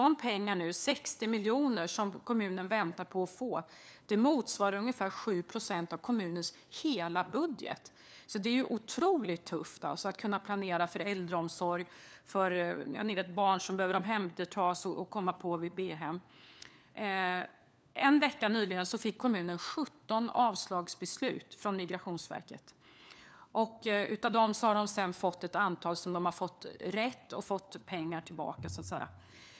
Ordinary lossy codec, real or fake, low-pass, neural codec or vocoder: none; fake; none; codec, 16 kHz, 4 kbps, FunCodec, trained on LibriTTS, 50 frames a second